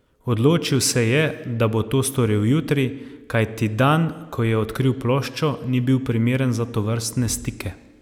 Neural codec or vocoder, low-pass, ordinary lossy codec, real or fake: none; 19.8 kHz; none; real